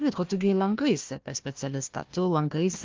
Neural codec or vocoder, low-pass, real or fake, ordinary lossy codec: codec, 16 kHz, 1 kbps, FunCodec, trained on Chinese and English, 50 frames a second; 7.2 kHz; fake; Opus, 32 kbps